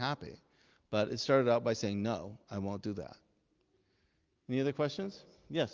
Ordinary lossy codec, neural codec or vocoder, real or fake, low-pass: Opus, 32 kbps; none; real; 7.2 kHz